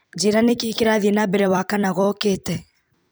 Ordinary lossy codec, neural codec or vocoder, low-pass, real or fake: none; vocoder, 44.1 kHz, 128 mel bands every 256 samples, BigVGAN v2; none; fake